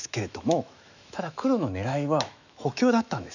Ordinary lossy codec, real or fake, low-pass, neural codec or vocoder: none; fake; 7.2 kHz; autoencoder, 48 kHz, 128 numbers a frame, DAC-VAE, trained on Japanese speech